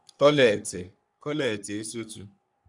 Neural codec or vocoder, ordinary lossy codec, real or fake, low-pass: codec, 44.1 kHz, 3.4 kbps, Pupu-Codec; none; fake; 10.8 kHz